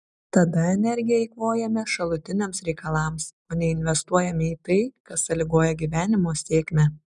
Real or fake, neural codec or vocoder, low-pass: real; none; 10.8 kHz